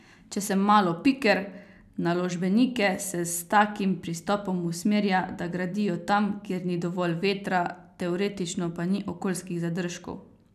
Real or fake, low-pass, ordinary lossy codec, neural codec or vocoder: real; 14.4 kHz; none; none